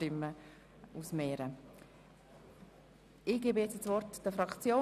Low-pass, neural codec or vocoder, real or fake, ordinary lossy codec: 14.4 kHz; none; real; none